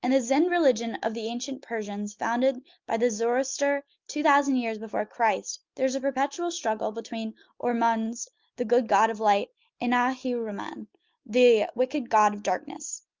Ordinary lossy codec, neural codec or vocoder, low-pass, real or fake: Opus, 32 kbps; none; 7.2 kHz; real